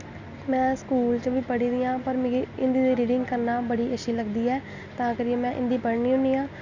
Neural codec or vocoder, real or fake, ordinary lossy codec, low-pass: none; real; none; 7.2 kHz